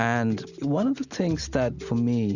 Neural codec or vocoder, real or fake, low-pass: none; real; 7.2 kHz